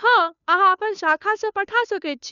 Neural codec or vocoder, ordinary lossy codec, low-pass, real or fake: codec, 16 kHz, 2 kbps, FunCodec, trained on Chinese and English, 25 frames a second; none; 7.2 kHz; fake